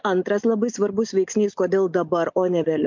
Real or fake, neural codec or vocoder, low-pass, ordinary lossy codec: real; none; 7.2 kHz; MP3, 64 kbps